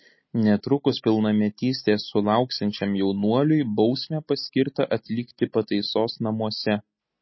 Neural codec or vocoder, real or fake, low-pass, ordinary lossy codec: none; real; 7.2 kHz; MP3, 24 kbps